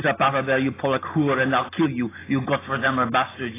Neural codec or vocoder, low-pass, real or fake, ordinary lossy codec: none; 3.6 kHz; real; AAC, 16 kbps